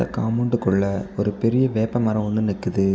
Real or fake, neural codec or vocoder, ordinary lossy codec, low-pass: real; none; none; none